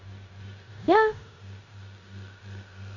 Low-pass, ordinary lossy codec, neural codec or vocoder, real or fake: 7.2 kHz; none; codec, 16 kHz in and 24 kHz out, 0.9 kbps, LongCat-Audio-Codec, four codebook decoder; fake